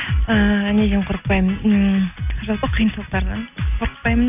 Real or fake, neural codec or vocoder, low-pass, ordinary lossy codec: real; none; 3.6 kHz; none